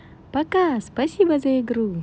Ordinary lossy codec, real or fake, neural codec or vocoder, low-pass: none; real; none; none